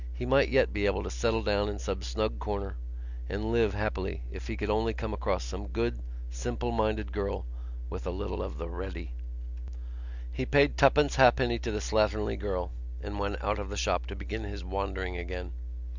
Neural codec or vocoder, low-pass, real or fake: none; 7.2 kHz; real